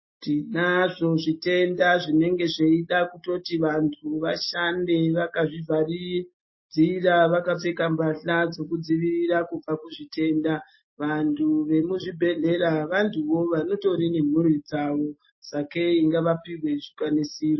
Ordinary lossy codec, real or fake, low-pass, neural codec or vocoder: MP3, 24 kbps; real; 7.2 kHz; none